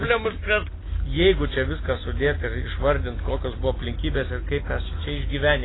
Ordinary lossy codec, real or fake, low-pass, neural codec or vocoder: AAC, 16 kbps; real; 7.2 kHz; none